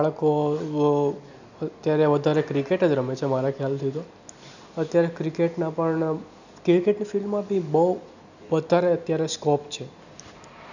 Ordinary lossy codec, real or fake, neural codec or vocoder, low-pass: none; real; none; 7.2 kHz